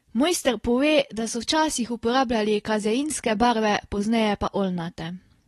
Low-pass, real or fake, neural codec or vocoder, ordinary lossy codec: 19.8 kHz; real; none; AAC, 32 kbps